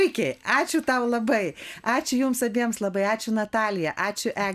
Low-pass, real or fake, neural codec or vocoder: 14.4 kHz; real; none